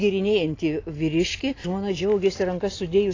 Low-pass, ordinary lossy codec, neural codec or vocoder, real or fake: 7.2 kHz; AAC, 32 kbps; none; real